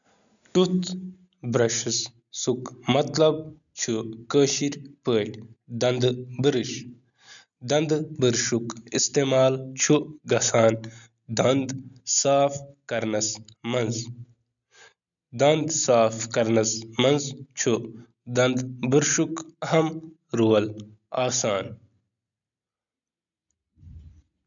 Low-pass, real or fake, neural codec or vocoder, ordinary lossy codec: 7.2 kHz; real; none; none